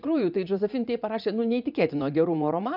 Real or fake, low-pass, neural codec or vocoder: fake; 5.4 kHz; vocoder, 44.1 kHz, 80 mel bands, Vocos